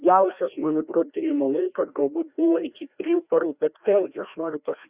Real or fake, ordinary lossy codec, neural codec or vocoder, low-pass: fake; Opus, 64 kbps; codec, 16 kHz, 1 kbps, FreqCodec, larger model; 3.6 kHz